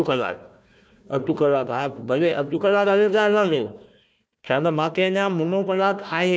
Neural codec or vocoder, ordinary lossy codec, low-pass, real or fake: codec, 16 kHz, 1 kbps, FunCodec, trained on Chinese and English, 50 frames a second; none; none; fake